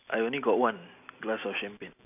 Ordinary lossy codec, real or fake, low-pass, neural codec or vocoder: none; real; 3.6 kHz; none